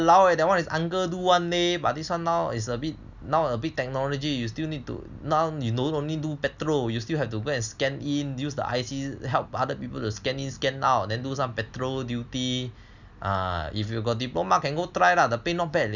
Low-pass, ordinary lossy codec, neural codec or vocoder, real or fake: 7.2 kHz; none; none; real